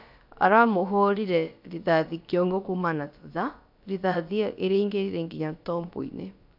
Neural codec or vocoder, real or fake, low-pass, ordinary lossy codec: codec, 16 kHz, about 1 kbps, DyCAST, with the encoder's durations; fake; 5.4 kHz; none